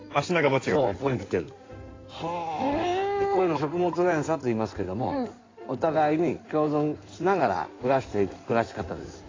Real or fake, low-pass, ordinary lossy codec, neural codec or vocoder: fake; 7.2 kHz; AAC, 32 kbps; codec, 16 kHz in and 24 kHz out, 2.2 kbps, FireRedTTS-2 codec